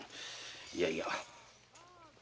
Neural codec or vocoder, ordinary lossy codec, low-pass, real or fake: none; none; none; real